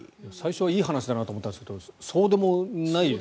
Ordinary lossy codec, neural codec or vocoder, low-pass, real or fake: none; none; none; real